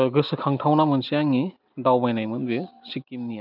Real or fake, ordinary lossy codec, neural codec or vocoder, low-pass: real; none; none; 5.4 kHz